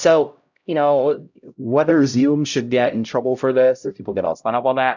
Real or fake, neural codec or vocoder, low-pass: fake; codec, 16 kHz, 0.5 kbps, X-Codec, HuBERT features, trained on LibriSpeech; 7.2 kHz